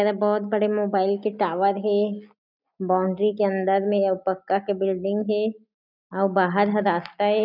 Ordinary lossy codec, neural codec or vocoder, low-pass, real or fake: none; none; 5.4 kHz; real